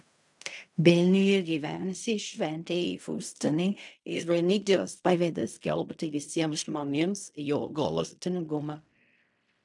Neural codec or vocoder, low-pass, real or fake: codec, 16 kHz in and 24 kHz out, 0.4 kbps, LongCat-Audio-Codec, fine tuned four codebook decoder; 10.8 kHz; fake